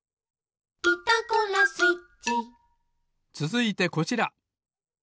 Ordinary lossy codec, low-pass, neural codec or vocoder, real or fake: none; none; none; real